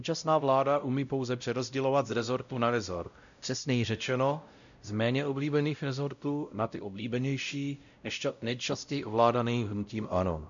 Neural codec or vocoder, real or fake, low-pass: codec, 16 kHz, 0.5 kbps, X-Codec, WavLM features, trained on Multilingual LibriSpeech; fake; 7.2 kHz